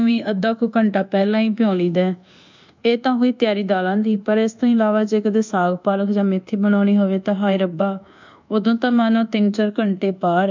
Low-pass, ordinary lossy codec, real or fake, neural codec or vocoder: 7.2 kHz; MP3, 64 kbps; fake; codec, 24 kHz, 1.2 kbps, DualCodec